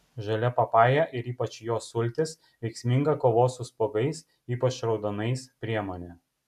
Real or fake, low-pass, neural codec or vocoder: real; 14.4 kHz; none